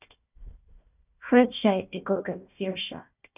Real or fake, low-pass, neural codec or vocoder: fake; 3.6 kHz; codec, 16 kHz, 1 kbps, FunCodec, trained on Chinese and English, 50 frames a second